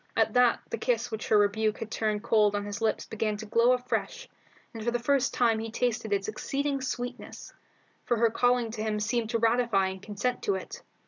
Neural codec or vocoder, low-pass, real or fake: none; 7.2 kHz; real